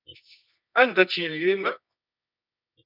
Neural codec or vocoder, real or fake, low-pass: codec, 24 kHz, 0.9 kbps, WavTokenizer, medium music audio release; fake; 5.4 kHz